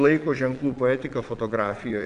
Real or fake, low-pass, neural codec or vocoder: fake; 9.9 kHz; vocoder, 22.05 kHz, 80 mel bands, Vocos